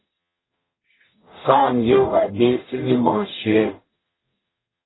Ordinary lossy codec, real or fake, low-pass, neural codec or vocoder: AAC, 16 kbps; fake; 7.2 kHz; codec, 44.1 kHz, 0.9 kbps, DAC